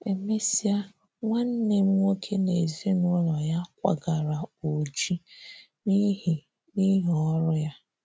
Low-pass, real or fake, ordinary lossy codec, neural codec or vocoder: none; real; none; none